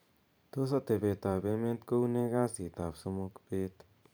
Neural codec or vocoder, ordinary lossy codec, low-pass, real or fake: vocoder, 44.1 kHz, 128 mel bands every 512 samples, BigVGAN v2; none; none; fake